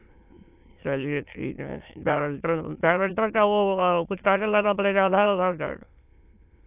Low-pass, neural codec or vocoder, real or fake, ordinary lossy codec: 3.6 kHz; autoencoder, 22.05 kHz, a latent of 192 numbers a frame, VITS, trained on many speakers; fake; AAC, 32 kbps